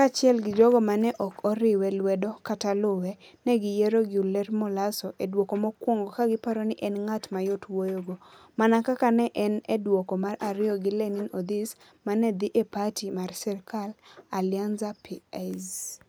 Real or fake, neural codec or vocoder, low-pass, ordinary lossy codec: real; none; none; none